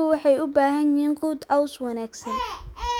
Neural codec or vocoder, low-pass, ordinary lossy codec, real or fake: autoencoder, 48 kHz, 128 numbers a frame, DAC-VAE, trained on Japanese speech; 19.8 kHz; none; fake